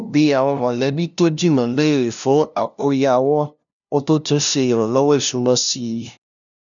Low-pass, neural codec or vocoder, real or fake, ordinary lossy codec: 7.2 kHz; codec, 16 kHz, 0.5 kbps, FunCodec, trained on LibriTTS, 25 frames a second; fake; none